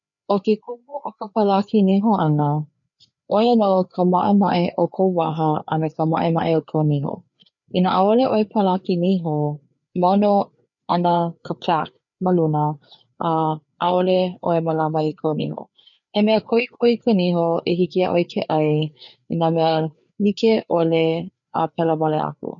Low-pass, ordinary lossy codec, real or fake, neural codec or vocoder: 7.2 kHz; none; fake; codec, 16 kHz, 4 kbps, FreqCodec, larger model